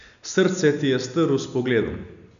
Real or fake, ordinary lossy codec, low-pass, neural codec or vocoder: real; none; 7.2 kHz; none